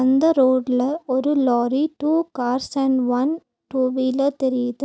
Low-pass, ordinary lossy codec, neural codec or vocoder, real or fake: none; none; none; real